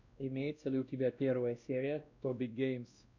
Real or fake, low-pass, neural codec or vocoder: fake; 7.2 kHz; codec, 16 kHz, 1 kbps, X-Codec, WavLM features, trained on Multilingual LibriSpeech